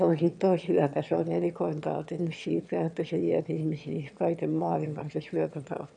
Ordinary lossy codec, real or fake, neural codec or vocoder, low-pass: none; fake; autoencoder, 22.05 kHz, a latent of 192 numbers a frame, VITS, trained on one speaker; 9.9 kHz